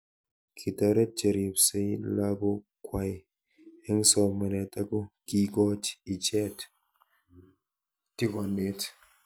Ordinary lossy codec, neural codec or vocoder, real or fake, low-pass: none; none; real; none